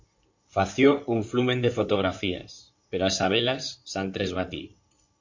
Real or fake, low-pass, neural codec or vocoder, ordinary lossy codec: fake; 7.2 kHz; codec, 16 kHz in and 24 kHz out, 2.2 kbps, FireRedTTS-2 codec; MP3, 48 kbps